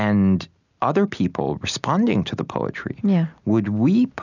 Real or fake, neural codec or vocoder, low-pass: real; none; 7.2 kHz